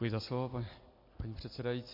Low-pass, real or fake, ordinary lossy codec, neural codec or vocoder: 5.4 kHz; real; MP3, 32 kbps; none